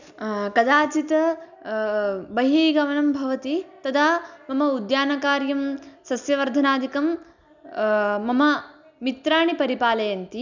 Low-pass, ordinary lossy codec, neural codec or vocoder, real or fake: 7.2 kHz; none; none; real